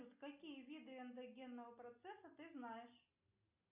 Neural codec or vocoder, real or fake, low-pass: none; real; 3.6 kHz